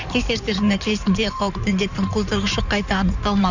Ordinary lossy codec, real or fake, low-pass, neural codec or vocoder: none; fake; 7.2 kHz; codec, 16 kHz in and 24 kHz out, 2.2 kbps, FireRedTTS-2 codec